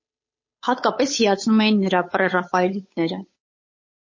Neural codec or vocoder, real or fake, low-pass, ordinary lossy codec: codec, 16 kHz, 8 kbps, FunCodec, trained on Chinese and English, 25 frames a second; fake; 7.2 kHz; MP3, 32 kbps